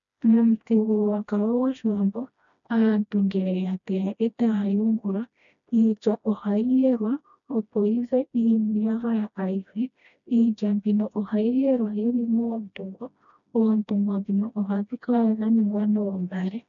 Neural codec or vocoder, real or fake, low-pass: codec, 16 kHz, 1 kbps, FreqCodec, smaller model; fake; 7.2 kHz